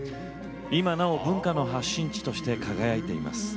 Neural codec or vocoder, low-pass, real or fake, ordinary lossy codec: none; none; real; none